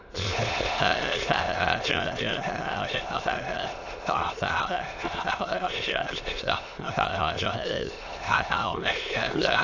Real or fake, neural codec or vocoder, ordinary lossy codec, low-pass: fake; autoencoder, 22.05 kHz, a latent of 192 numbers a frame, VITS, trained on many speakers; AAC, 48 kbps; 7.2 kHz